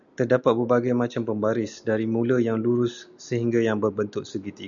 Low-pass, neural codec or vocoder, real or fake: 7.2 kHz; none; real